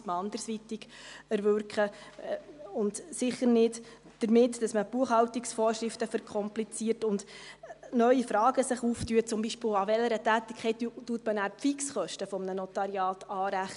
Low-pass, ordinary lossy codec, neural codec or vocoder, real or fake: 10.8 kHz; none; none; real